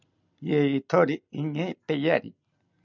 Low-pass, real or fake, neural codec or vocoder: 7.2 kHz; real; none